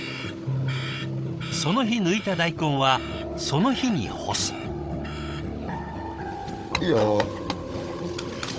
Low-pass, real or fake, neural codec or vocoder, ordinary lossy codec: none; fake; codec, 16 kHz, 16 kbps, FunCodec, trained on Chinese and English, 50 frames a second; none